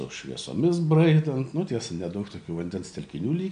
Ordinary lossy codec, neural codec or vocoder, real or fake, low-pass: MP3, 96 kbps; none; real; 9.9 kHz